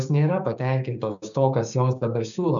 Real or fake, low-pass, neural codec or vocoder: fake; 7.2 kHz; codec, 16 kHz, 6 kbps, DAC